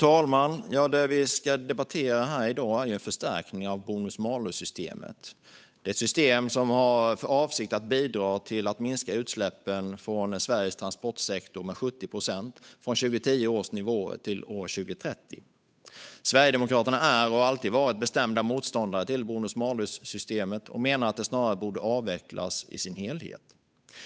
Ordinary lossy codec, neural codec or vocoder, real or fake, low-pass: none; codec, 16 kHz, 8 kbps, FunCodec, trained on Chinese and English, 25 frames a second; fake; none